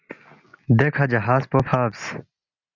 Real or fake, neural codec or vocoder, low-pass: real; none; 7.2 kHz